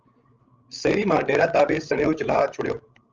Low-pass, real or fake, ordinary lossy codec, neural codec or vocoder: 7.2 kHz; fake; Opus, 24 kbps; codec, 16 kHz, 16 kbps, FreqCodec, larger model